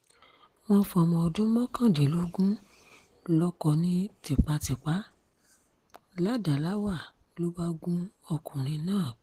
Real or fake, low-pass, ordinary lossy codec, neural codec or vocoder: real; 14.4 kHz; Opus, 16 kbps; none